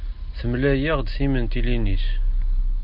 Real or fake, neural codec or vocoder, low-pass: real; none; 5.4 kHz